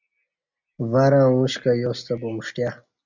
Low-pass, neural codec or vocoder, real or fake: 7.2 kHz; none; real